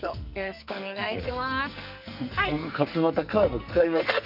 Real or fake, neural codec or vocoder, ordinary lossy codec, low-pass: fake; codec, 44.1 kHz, 2.6 kbps, SNAC; none; 5.4 kHz